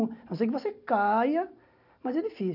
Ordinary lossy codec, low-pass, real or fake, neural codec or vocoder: none; 5.4 kHz; real; none